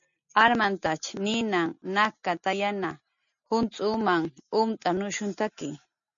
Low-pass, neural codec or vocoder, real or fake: 7.2 kHz; none; real